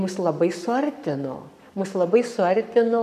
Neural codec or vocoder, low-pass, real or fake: codec, 44.1 kHz, 7.8 kbps, Pupu-Codec; 14.4 kHz; fake